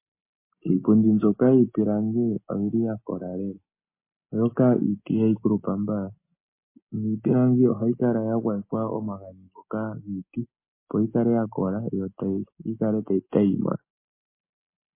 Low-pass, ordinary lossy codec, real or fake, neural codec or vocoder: 3.6 kHz; MP3, 24 kbps; real; none